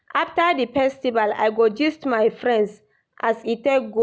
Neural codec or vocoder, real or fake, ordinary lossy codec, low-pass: none; real; none; none